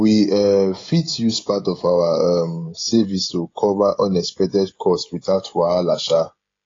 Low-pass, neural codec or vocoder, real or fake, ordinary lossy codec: 7.2 kHz; codec, 16 kHz, 16 kbps, FreqCodec, smaller model; fake; AAC, 32 kbps